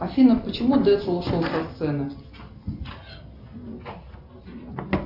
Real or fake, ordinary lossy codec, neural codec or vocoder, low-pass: real; AAC, 48 kbps; none; 5.4 kHz